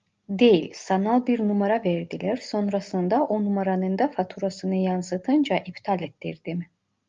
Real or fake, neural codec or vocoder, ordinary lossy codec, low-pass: real; none; Opus, 24 kbps; 7.2 kHz